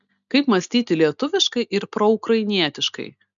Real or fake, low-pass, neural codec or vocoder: real; 7.2 kHz; none